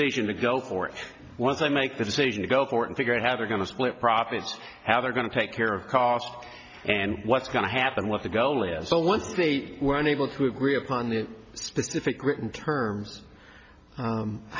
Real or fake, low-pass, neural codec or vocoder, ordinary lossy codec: real; 7.2 kHz; none; MP3, 64 kbps